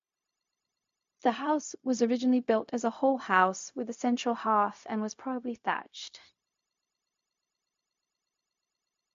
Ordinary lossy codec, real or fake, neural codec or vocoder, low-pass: MP3, 48 kbps; fake; codec, 16 kHz, 0.4 kbps, LongCat-Audio-Codec; 7.2 kHz